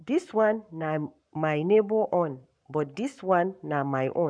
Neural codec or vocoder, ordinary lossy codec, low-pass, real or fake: codec, 44.1 kHz, 7.8 kbps, Pupu-Codec; none; 9.9 kHz; fake